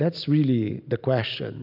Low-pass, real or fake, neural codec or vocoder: 5.4 kHz; real; none